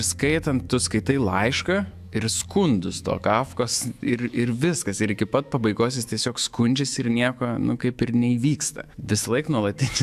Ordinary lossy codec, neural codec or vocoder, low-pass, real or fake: AAC, 96 kbps; autoencoder, 48 kHz, 128 numbers a frame, DAC-VAE, trained on Japanese speech; 14.4 kHz; fake